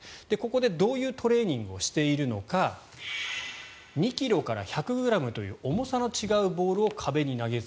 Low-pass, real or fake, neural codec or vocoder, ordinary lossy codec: none; real; none; none